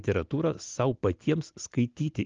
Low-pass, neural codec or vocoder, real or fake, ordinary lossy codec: 7.2 kHz; none; real; Opus, 24 kbps